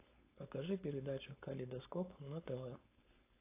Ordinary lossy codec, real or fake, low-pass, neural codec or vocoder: AAC, 24 kbps; fake; 3.6 kHz; codec, 16 kHz, 4.8 kbps, FACodec